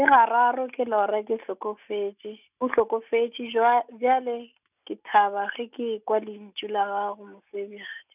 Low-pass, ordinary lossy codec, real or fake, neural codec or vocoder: 3.6 kHz; none; real; none